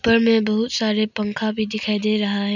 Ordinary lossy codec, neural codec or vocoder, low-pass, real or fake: none; none; 7.2 kHz; real